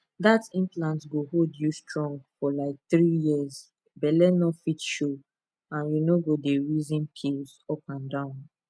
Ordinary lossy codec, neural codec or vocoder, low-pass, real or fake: none; none; none; real